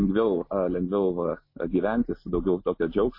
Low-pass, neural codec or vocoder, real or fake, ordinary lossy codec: 5.4 kHz; none; real; MP3, 24 kbps